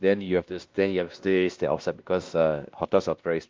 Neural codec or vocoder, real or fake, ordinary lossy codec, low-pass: codec, 16 kHz, 1 kbps, X-Codec, WavLM features, trained on Multilingual LibriSpeech; fake; Opus, 24 kbps; 7.2 kHz